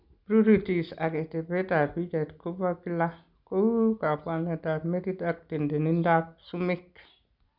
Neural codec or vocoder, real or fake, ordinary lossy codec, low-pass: none; real; none; 5.4 kHz